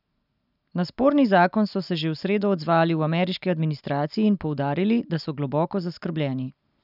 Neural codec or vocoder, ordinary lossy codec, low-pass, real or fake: none; none; 5.4 kHz; real